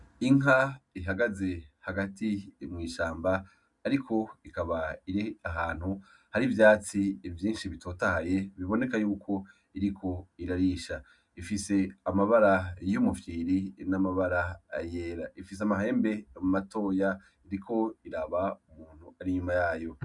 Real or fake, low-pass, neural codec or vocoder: real; 10.8 kHz; none